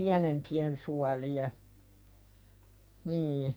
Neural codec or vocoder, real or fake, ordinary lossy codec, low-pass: codec, 44.1 kHz, 2.6 kbps, SNAC; fake; none; none